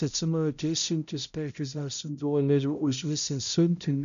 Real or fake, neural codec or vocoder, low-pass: fake; codec, 16 kHz, 0.5 kbps, X-Codec, HuBERT features, trained on balanced general audio; 7.2 kHz